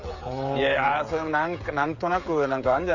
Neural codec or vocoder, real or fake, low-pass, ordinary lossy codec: codec, 16 kHz, 16 kbps, FreqCodec, smaller model; fake; 7.2 kHz; none